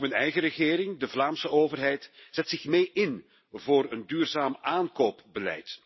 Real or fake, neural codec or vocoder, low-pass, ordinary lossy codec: real; none; 7.2 kHz; MP3, 24 kbps